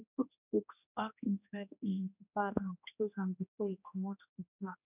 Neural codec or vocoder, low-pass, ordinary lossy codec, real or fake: codec, 16 kHz, 1 kbps, X-Codec, HuBERT features, trained on general audio; 3.6 kHz; none; fake